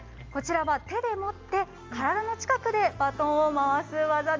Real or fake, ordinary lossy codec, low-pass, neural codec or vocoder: real; Opus, 32 kbps; 7.2 kHz; none